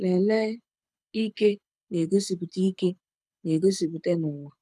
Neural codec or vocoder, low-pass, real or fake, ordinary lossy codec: codec, 24 kHz, 6 kbps, HILCodec; none; fake; none